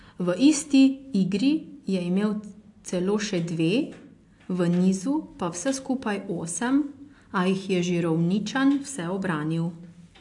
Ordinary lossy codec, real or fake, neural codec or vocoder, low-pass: AAC, 64 kbps; real; none; 10.8 kHz